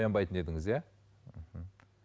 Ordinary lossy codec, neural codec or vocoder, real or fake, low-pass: none; none; real; none